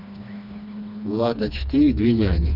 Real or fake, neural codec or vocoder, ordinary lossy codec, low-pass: fake; codec, 16 kHz, 2 kbps, FreqCodec, smaller model; none; 5.4 kHz